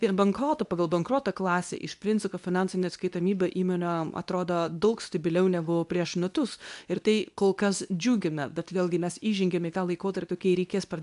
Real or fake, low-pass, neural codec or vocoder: fake; 10.8 kHz; codec, 24 kHz, 0.9 kbps, WavTokenizer, medium speech release version 2